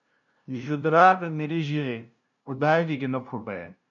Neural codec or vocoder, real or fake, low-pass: codec, 16 kHz, 0.5 kbps, FunCodec, trained on LibriTTS, 25 frames a second; fake; 7.2 kHz